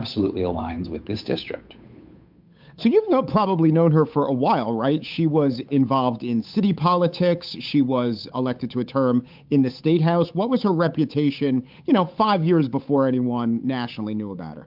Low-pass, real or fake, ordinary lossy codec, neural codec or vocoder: 5.4 kHz; fake; MP3, 48 kbps; codec, 16 kHz, 8 kbps, FunCodec, trained on Chinese and English, 25 frames a second